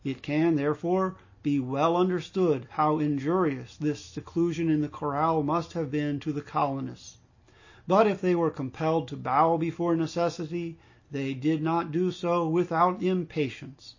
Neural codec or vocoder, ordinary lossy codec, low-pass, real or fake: none; MP3, 32 kbps; 7.2 kHz; real